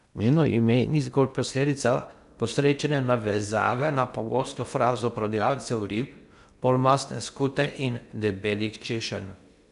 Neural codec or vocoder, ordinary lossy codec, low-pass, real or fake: codec, 16 kHz in and 24 kHz out, 0.6 kbps, FocalCodec, streaming, 2048 codes; none; 10.8 kHz; fake